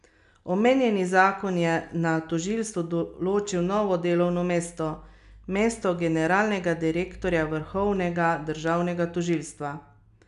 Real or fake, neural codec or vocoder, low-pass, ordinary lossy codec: real; none; 10.8 kHz; none